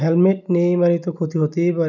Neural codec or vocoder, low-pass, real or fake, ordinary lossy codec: none; 7.2 kHz; real; none